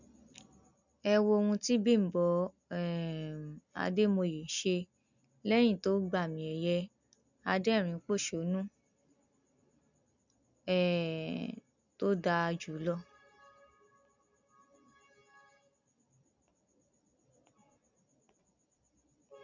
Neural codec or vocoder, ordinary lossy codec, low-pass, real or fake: none; none; 7.2 kHz; real